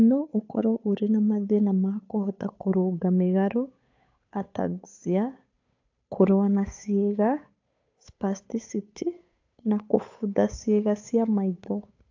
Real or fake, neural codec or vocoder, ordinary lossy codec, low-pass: fake; codec, 16 kHz, 8 kbps, FunCodec, trained on Chinese and English, 25 frames a second; AAC, 48 kbps; 7.2 kHz